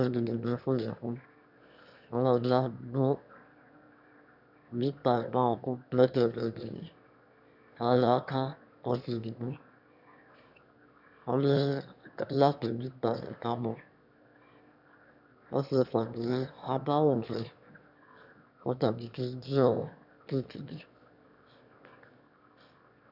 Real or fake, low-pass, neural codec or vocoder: fake; 5.4 kHz; autoencoder, 22.05 kHz, a latent of 192 numbers a frame, VITS, trained on one speaker